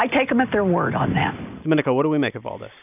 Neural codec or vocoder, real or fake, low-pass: none; real; 3.6 kHz